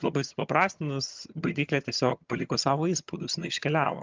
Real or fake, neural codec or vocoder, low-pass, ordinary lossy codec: fake; vocoder, 22.05 kHz, 80 mel bands, HiFi-GAN; 7.2 kHz; Opus, 24 kbps